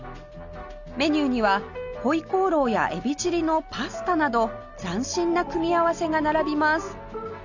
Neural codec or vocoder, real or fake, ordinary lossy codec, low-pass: none; real; none; 7.2 kHz